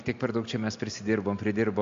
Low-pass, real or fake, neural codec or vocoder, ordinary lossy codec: 7.2 kHz; real; none; MP3, 48 kbps